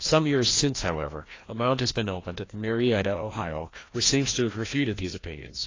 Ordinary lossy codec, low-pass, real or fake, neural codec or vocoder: AAC, 32 kbps; 7.2 kHz; fake; codec, 16 kHz, 1 kbps, FreqCodec, larger model